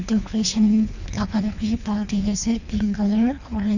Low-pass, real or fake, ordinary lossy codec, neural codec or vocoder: 7.2 kHz; fake; none; codec, 24 kHz, 3 kbps, HILCodec